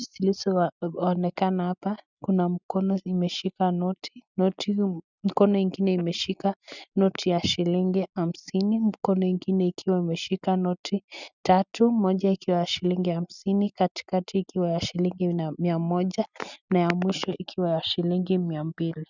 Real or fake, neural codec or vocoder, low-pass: real; none; 7.2 kHz